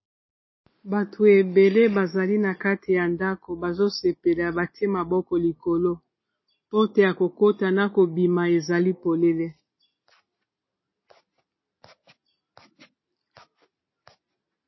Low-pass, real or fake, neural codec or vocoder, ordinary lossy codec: 7.2 kHz; real; none; MP3, 24 kbps